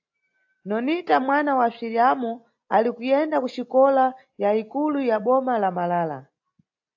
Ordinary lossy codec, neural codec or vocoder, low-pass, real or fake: AAC, 48 kbps; none; 7.2 kHz; real